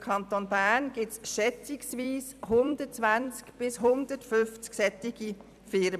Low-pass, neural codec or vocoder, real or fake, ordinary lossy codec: 14.4 kHz; vocoder, 44.1 kHz, 128 mel bands every 256 samples, BigVGAN v2; fake; none